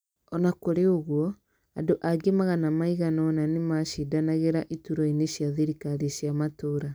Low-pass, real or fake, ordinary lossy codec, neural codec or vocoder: none; real; none; none